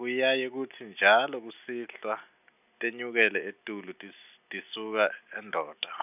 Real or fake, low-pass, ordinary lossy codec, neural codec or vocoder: real; 3.6 kHz; none; none